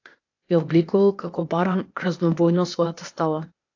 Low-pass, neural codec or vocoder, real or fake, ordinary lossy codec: 7.2 kHz; codec, 16 kHz, 0.8 kbps, ZipCodec; fake; AAC, 48 kbps